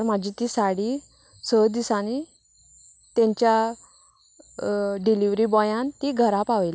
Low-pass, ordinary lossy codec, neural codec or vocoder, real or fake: none; none; none; real